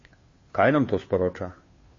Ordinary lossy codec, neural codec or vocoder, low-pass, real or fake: MP3, 32 kbps; codec, 16 kHz, 2 kbps, FunCodec, trained on Chinese and English, 25 frames a second; 7.2 kHz; fake